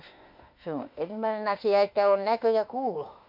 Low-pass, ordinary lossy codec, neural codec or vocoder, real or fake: 5.4 kHz; MP3, 48 kbps; autoencoder, 48 kHz, 32 numbers a frame, DAC-VAE, trained on Japanese speech; fake